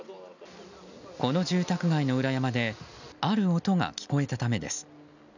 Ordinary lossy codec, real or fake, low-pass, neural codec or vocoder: none; real; 7.2 kHz; none